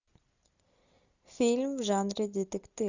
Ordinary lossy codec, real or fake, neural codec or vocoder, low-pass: Opus, 64 kbps; real; none; 7.2 kHz